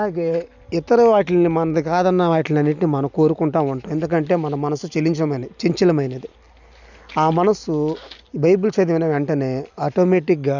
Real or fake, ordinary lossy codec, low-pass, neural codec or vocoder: real; none; 7.2 kHz; none